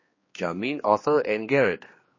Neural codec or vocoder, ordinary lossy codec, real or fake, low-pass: codec, 16 kHz, 2 kbps, X-Codec, HuBERT features, trained on general audio; MP3, 32 kbps; fake; 7.2 kHz